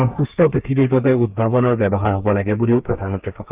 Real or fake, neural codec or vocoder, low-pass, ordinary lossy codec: fake; codec, 32 kHz, 1.9 kbps, SNAC; 3.6 kHz; Opus, 24 kbps